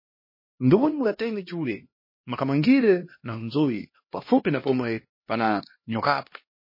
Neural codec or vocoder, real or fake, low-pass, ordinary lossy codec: codec, 16 kHz, 1 kbps, X-Codec, HuBERT features, trained on LibriSpeech; fake; 5.4 kHz; MP3, 24 kbps